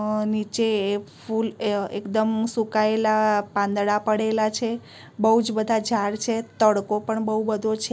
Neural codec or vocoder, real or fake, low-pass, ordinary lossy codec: none; real; none; none